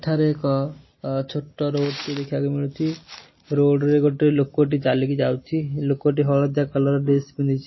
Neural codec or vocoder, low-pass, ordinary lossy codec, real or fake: none; 7.2 kHz; MP3, 24 kbps; real